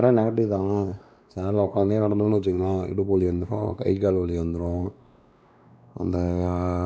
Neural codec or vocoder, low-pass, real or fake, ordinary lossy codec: codec, 16 kHz, 2 kbps, X-Codec, WavLM features, trained on Multilingual LibriSpeech; none; fake; none